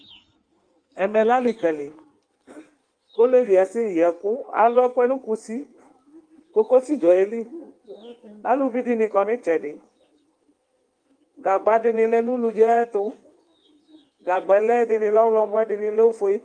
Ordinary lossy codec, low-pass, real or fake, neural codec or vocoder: Opus, 32 kbps; 9.9 kHz; fake; codec, 16 kHz in and 24 kHz out, 1.1 kbps, FireRedTTS-2 codec